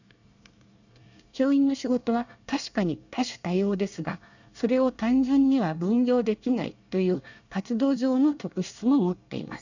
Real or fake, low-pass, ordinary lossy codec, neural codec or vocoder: fake; 7.2 kHz; none; codec, 24 kHz, 1 kbps, SNAC